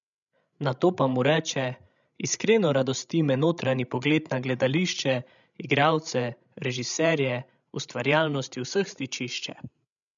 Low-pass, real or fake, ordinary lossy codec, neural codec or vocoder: 7.2 kHz; fake; none; codec, 16 kHz, 16 kbps, FreqCodec, larger model